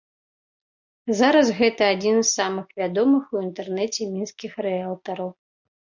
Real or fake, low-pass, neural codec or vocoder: real; 7.2 kHz; none